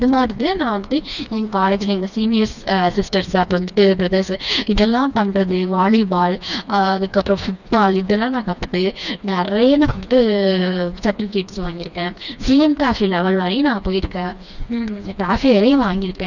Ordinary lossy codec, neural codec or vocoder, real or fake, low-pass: none; codec, 16 kHz, 2 kbps, FreqCodec, smaller model; fake; 7.2 kHz